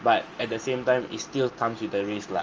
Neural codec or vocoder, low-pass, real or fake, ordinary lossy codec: none; 7.2 kHz; real; Opus, 24 kbps